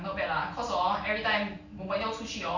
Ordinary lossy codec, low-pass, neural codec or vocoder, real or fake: none; 7.2 kHz; none; real